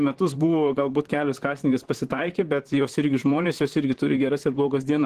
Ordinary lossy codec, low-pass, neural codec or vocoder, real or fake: Opus, 16 kbps; 14.4 kHz; vocoder, 44.1 kHz, 128 mel bands, Pupu-Vocoder; fake